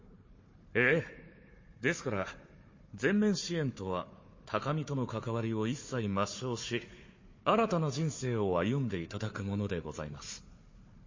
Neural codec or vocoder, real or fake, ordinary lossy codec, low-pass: codec, 16 kHz, 4 kbps, FunCodec, trained on Chinese and English, 50 frames a second; fake; MP3, 32 kbps; 7.2 kHz